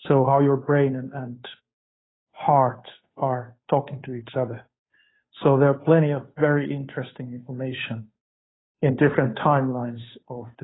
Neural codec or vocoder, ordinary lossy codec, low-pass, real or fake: codec, 16 kHz, 2 kbps, FunCodec, trained on Chinese and English, 25 frames a second; AAC, 16 kbps; 7.2 kHz; fake